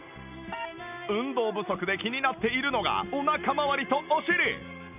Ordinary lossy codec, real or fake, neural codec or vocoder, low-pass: none; real; none; 3.6 kHz